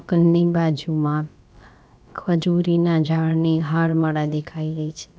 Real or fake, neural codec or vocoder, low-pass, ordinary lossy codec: fake; codec, 16 kHz, about 1 kbps, DyCAST, with the encoder's durations; none; none